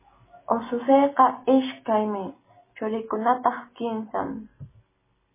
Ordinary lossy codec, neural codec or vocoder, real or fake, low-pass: MP3, 16 kbps; none; real; 3.6 kHz